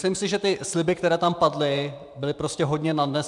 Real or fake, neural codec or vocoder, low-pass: fake; vocoder, 44.1 kHz, 128 mel bands every 512 samples, BigVGAN v2; 10.8 kHz